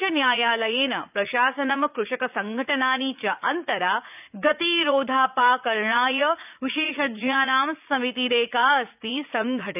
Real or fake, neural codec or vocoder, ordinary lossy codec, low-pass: fake; vocoder, 44.1 kHz, 80 mel bands, Vocos; none; 3.6 kHz